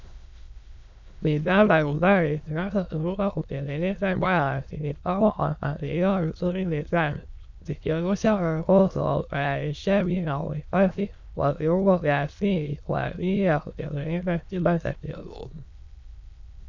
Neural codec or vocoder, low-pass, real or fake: autoencoder, 22.05 kHz, a latent of 192 numbers a frame, VITS, trained on many speakers; 7.2 kHz; fake